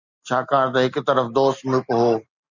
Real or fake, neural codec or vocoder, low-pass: real; none; 7.2 kHz